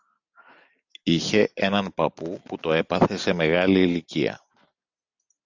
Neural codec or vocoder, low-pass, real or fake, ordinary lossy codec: none; 7.2 kHz; real; Opus, 64 kbps